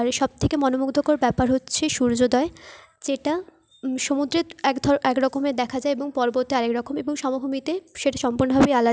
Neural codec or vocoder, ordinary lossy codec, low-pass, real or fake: none; none; none; real